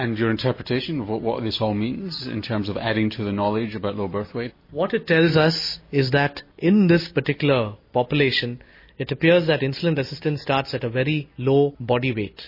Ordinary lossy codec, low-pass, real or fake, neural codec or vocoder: MP3, 24 kbps; 5.4 kHz; real; none